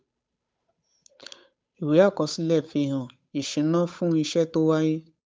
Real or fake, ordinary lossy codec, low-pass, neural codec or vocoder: fake; none; none; codec, 16 kHz, 8 kbps, FunCodec, trained on Chinese and English, 25 frames a second